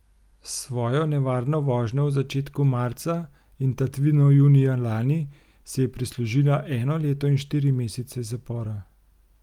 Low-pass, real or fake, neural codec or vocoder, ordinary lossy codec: 19.8 kHz; real; none; Opus, 32 kbps